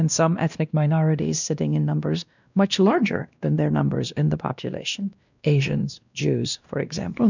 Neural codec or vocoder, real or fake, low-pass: codec, 16 kHz, 1 kbps, X-Codec, WavLM features, trained on Multilingual LibriSpeech; fake; 7.2 kHz